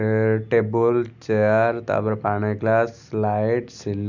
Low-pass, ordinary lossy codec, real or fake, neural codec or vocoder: 7.2 kHz; none; real; none